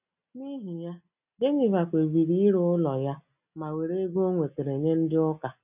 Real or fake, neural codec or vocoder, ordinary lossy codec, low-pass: real; none; none; 3.6 kHz